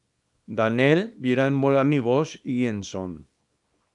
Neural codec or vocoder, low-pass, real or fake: codec, 24 kHz, 0.9 kbps, WavTokenizer, small release; 10.8 kHz; fake